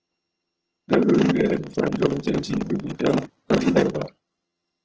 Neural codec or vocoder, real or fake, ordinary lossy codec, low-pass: vocoder, 22.05 kHz, 80 mel bands, HiFi-GAN; fake; Opus, 16 kbps; 7.2 kHz